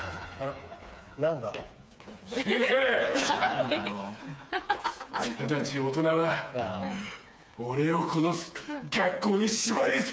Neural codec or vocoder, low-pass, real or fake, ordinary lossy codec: codec, 16 kHz, 4 kbps, FreqCodec, smaller model; none; fake; none